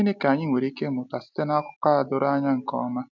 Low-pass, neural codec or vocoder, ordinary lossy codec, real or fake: 7.2 kHz; none; none; real